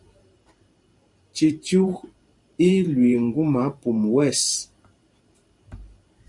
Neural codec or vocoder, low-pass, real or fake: vocoder, 44.1 kHz, 128 mel bands every 512 samples, BigVGAN v2; 10.8 kHz; fake